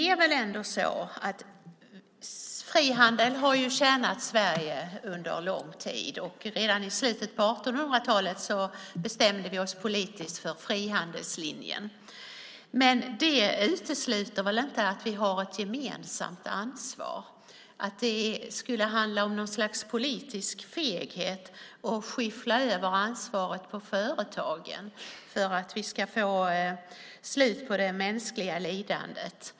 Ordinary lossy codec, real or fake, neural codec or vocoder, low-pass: none; real; none; none